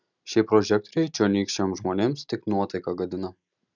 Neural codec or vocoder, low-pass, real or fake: none; 7.2 kHz; real